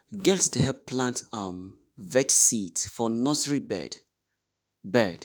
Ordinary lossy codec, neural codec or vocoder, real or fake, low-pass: none; autoencoder, 48 kHz, 32 numbers a frame, DAC-VAE, trained on Japanese speech; fake; none